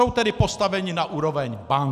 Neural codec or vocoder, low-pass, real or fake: none; 14.4 kHz; real